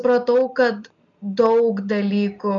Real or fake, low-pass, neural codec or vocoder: real; 7.2 kHz; none